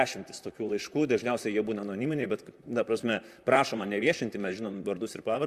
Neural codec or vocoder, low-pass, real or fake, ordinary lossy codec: vocoder, 44.1 kHz, 128 mel bands, Pupu-Vocoder; 14.4 kHz; fake; Opus, 64 kbps